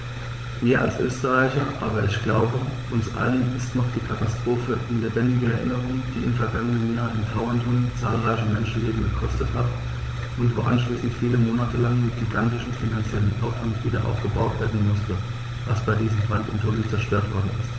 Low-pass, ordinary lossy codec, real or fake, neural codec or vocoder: none; none; fake; codec, 16 kHz, 16 kbps, FunCodec, trained on LibriTTS, 50 frames a second